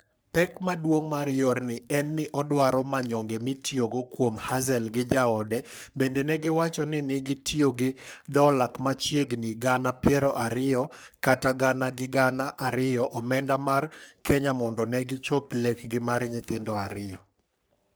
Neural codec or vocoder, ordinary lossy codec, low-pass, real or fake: codec, 44.1 kHz, 3.4 kbps, Pupu-Codec; none; none; fake